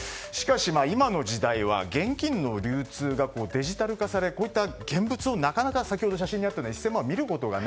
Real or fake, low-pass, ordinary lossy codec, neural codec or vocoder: real; none; none; none